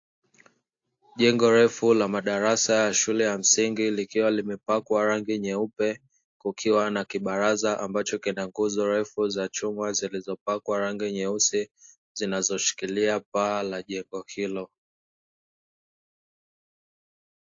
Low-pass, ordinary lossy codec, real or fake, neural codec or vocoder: 7.2 kHz; AAC, 48 kbps; real; none